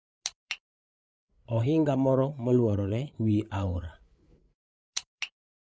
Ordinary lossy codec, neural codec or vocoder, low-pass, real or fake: none; codec, 16 kHz, 8 kbps, FreqCodec, larger model; none; fake